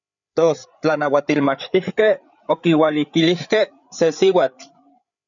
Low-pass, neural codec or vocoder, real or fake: 7.2 kHz; codec, 16 kHz, 8 kbps, FreqCodec, larger model; fake